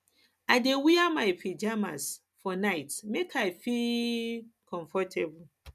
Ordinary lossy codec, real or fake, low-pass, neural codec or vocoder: none; real; 14.4 kHz; none